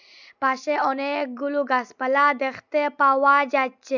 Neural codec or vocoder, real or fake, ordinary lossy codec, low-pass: none; real; none; 7.2 kHz